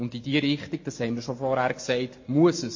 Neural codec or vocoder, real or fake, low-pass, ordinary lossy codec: none; real; 7.2 kHz; MP3, 32 kbps